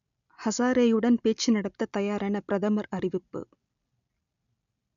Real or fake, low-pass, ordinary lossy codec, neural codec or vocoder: real; 7.2 kHz; none; none